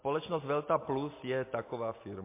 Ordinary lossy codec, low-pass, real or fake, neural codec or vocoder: MP3, 24 kbps; 3.6 kHz; real; none